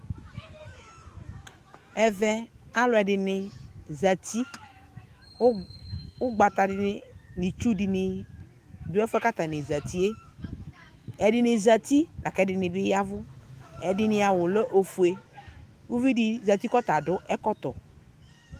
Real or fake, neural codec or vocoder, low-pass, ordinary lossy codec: fake; autoencoder, 48 kHz, 128 numbers a frame, DAC-VAE, trained on Japanese speech; 14.4 kHz; Opus, 24 kbps